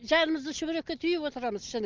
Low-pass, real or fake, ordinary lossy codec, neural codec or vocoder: 7.2 kHz; real; Opus, 24 kbps; none